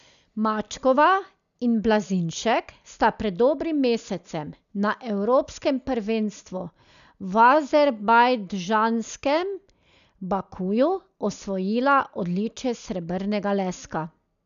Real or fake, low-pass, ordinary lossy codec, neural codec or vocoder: real; 7.2 kHz; none; none